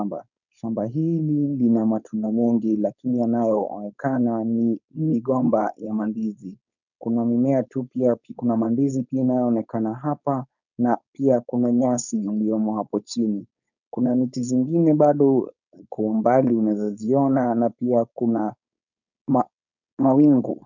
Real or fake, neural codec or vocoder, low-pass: fake; codec, 16 kHz, 4.8 kbps, FACodec; 7.2 kHz